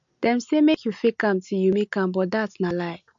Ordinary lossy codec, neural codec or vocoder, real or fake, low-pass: MP3, 48 kbps; none; real; 7.2 kHz